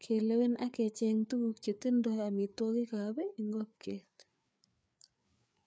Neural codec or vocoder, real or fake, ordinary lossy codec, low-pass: codec, 16 kHz, 4 kbps, FreqCodec, larger model; fake; none; none